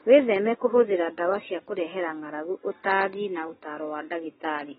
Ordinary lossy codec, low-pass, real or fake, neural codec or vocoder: AAC, 16 kbps; 19.8 kHz; real; none